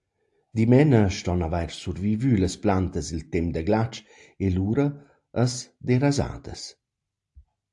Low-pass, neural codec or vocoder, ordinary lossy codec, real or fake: 10.8 kHz; none; AAC, 64 kbps; real